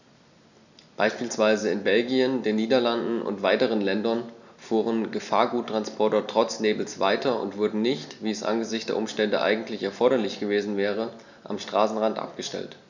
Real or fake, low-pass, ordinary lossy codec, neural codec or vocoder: real; 7.2 kHz; none; none